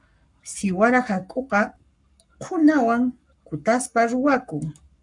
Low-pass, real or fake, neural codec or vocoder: 10.8 kHz; fake; codec, 44.1 kHz, 7.8 kbps, Pupu-Codec